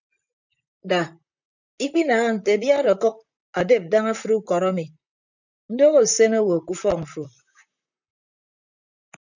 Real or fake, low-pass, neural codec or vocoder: fake; 7.2 kHz; vocoder, 44.1 kHz, 128 mel bands, Pupu-Vocoder